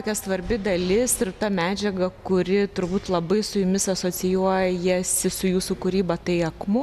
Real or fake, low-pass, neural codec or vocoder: real; 14.4 kHz; none